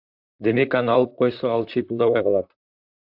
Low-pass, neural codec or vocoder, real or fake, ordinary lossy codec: 5.4 kHz; vocoder, 44.1 kHz, 128 mel bands, Pupu-Vocoder; fake; AAC, 48 kbps